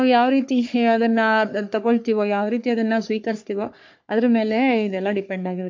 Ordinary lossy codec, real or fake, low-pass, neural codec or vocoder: MP3, 48 kbps; fake; 7.2 kHz; codec, 44.1 kHz, 3.4 kbps, Pupu-Codec